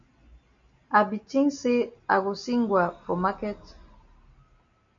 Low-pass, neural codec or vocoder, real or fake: 7.2 kHz; none; real